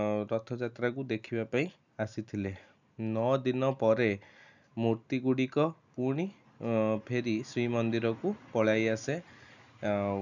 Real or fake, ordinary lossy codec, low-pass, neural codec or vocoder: real; none; 7.2 kHz; none